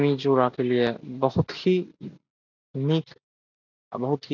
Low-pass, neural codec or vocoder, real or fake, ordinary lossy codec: 7.2 kHz; none; real; none